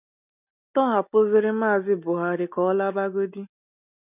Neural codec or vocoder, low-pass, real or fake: none; 3.6 kHz; real